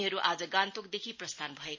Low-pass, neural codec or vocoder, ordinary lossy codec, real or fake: 7.2 kHz; none; MP3, 32 kbps; real